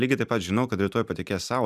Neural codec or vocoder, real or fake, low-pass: vocoder, 44.1 kHz, 128 mel bands every 256 samples, BigVGAN v2; fake; 14.4 kHz